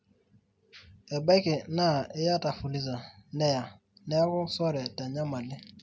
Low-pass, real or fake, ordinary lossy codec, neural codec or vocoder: none; real; none; none